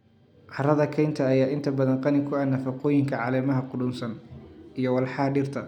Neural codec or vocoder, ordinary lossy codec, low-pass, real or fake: none; none; 19.8 kHz; real